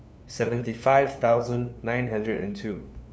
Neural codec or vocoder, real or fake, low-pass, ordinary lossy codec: codec, 16 kHz, 2 kbps, FunCodec, trained on LibriTTS, 25 frames a second; fake; none; none